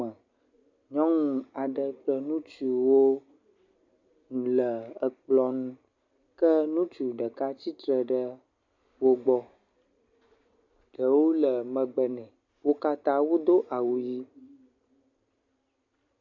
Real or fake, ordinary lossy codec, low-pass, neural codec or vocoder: real; MP3, 48 kbps; 7.2 kHz; none